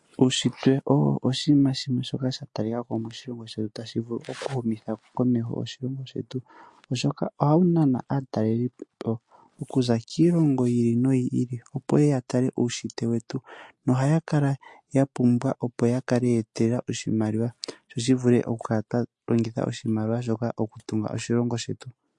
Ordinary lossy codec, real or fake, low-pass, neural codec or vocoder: MP3, 48 kbps; real; 10.8 kHz; none